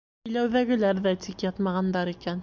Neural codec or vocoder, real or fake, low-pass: none; real; 7.2 kHz